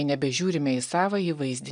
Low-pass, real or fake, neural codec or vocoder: 9.9 kHz; real; none